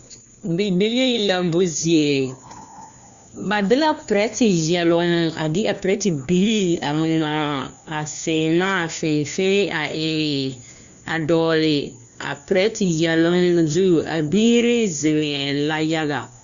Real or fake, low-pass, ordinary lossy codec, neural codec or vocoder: fake; 7.2 kHz; Opus, 64 kbps; codec, 16 kHz, 1 kbps, FunCodec, trained on LibriTTS, 50 frames a second